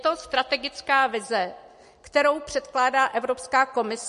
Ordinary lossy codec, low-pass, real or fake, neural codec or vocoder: MP3, 48 kbps; 14.4 kHz; real; none